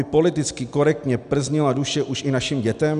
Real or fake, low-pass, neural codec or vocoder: real; 10.8 kHz; none